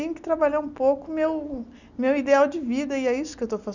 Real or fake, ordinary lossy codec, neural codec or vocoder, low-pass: real; none; none; 7.2 kHz